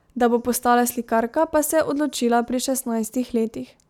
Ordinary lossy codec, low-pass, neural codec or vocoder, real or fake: none; 19.8 kHz; none; real